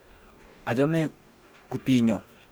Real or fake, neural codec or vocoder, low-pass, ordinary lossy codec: fake; codec, 44.1 kHz, 2.6 kbps, DAC; none; none